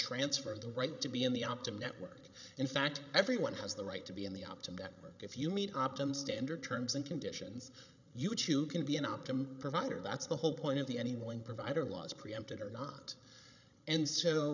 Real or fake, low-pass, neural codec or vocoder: fake; 7.2 kHz; codec, 16 kHz, 16 kbps, FreqCodec, larger model